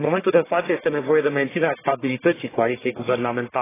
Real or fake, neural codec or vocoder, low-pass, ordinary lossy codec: fake; codec, 44.1 kHz, 1.7 kbps, Pupu-Codec; 3.6 kHz; AAC, 16 kbps